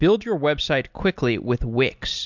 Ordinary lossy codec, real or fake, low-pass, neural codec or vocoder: MP3, 64 kbps; real; 7.2 kHz; none